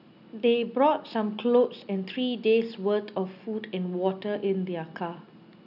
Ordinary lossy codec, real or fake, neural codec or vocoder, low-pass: none; real; none; 5.4 kHz